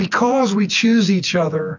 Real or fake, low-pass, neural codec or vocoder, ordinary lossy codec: fake; 7.2 kHz; vocoder, 24 kHz, 100 mel bands, Vocos; AAC, 48 kbps